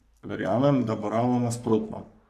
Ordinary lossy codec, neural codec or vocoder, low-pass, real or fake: AAC, 96 kbps; codec, 44.1 kHz, 3.4 kbps, Pupu-Codec; 14.4 kHz; fake